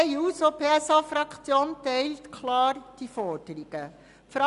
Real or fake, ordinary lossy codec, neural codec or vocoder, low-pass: real; none; none; 10.8 kHz